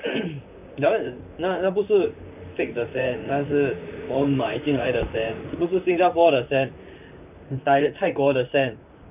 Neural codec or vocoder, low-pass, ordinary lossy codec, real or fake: vocoder, 44.1 kHz, 128 mel bands, Pupu-Vocoder; 3.6 kHz; none; fake